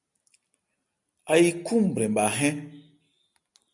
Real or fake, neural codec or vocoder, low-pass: real; none; 10.8 kHz